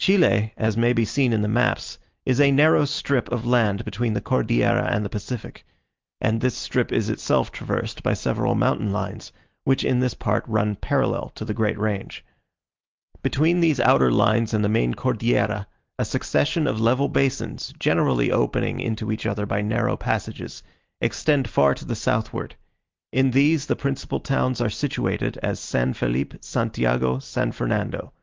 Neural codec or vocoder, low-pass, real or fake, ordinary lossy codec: none; 7.2 kHz; real; Opus, 32 kbps